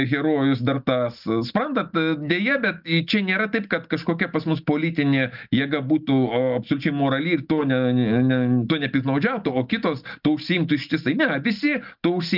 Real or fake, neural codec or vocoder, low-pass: real; none; 5.4 kHz